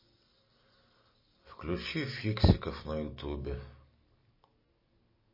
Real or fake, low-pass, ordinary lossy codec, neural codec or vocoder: real; 5.4 kHz; MP3, 24 kbps; none